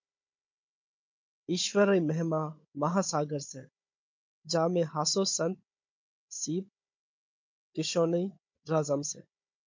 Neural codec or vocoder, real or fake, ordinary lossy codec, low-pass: codec, 16 kHz, 16 kbps, FunCodec, trained on Chinese and English, 50 frames a second; fake; MP3, 48 kbps; 7.2 kHz